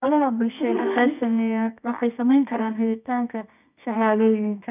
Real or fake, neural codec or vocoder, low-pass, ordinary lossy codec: fake; codec, 24 kHz, 0.9 kbps, WavTokenizer, medium music audio release; 3.6 kHz; none